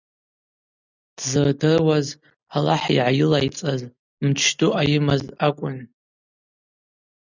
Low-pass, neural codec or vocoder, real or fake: 7.2 kHz; none; real